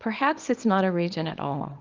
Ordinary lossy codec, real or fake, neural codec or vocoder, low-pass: Opus, 32 kbps; fake; codec, 24 kHz, 0.9 kbps, WavTokenizer, small release; 7.2 kHz